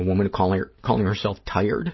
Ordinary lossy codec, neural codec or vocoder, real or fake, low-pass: MP3, 24 kbps; none; real; 7.2 kHz